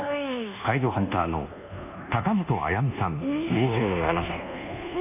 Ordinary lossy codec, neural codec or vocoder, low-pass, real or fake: none; codec, 24 kHz, 1.2 kbps, DualCodec; 3.6 kHz; fake